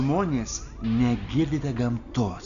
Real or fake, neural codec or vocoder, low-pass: real; none; 7.2 kHz